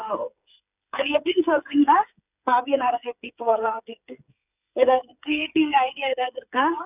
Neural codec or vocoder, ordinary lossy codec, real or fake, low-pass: codec, 16 kHz, 8 kbps, FreqCodec, smaller model; none; fake; 3.6 kHz